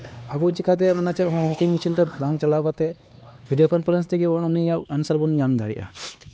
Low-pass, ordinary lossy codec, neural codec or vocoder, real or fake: none; none; codec, 16 kHz, 2 kbps, X-Codec, HuBERT features, trained on LibriSpeech; fake